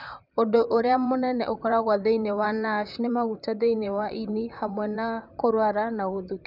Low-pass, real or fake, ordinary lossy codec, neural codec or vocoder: 5.4 kHz; fake; none; vocoder, 44.1 kHz, 128 mel bands, Pupu-Vocoder